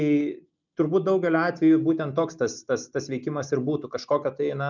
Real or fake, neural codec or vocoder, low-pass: real; none; 7.2 kHz